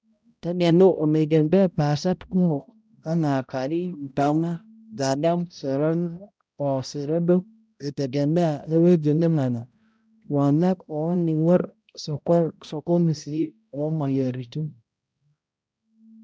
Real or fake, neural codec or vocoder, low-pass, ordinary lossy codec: fake; codec, 16 kHz, 0.5 kbps, X-Codec, HuBERT features, trained on balanced general audio; none; none